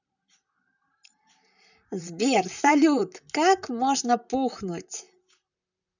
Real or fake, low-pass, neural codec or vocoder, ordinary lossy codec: fake; 7.2 kHz; vocoder, 44.1 kHz, 128 mel bands, Pupu-Vocoder; none